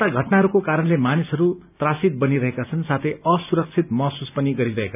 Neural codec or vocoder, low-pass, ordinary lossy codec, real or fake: none; 3.6 kHz; none; real